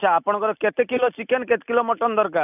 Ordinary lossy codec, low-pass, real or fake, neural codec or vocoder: none; 3.6 kHz; real; none